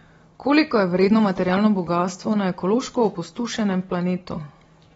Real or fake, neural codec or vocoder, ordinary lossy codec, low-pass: real; none; AAC, 24 kbps; 19.8 kHz